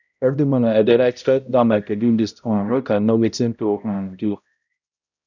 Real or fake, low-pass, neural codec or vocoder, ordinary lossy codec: fake; 7.2 kHz; codec, 16 kHz, 0.5 kbps, X-Codec, HuBERT features, trained on balanced general audio; none